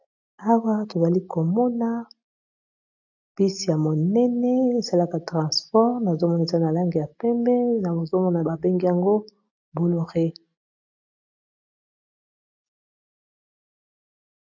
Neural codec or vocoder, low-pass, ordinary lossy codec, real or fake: none; 7.2 kHz; AAC, 48 kbps; real